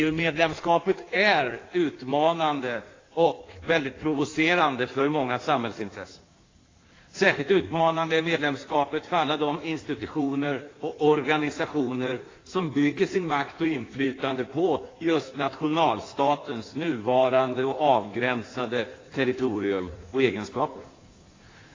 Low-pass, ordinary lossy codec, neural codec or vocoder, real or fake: 7.2 kHz; AAC, 32 kbps; codec, 16 kHz in and 24 kHz out, 1.1 kbps, FireRedTTS-2 codec; fake